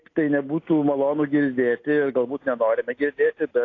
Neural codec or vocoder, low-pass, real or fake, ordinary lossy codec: none; 7.2 kHz; real; AAC, 32 kbps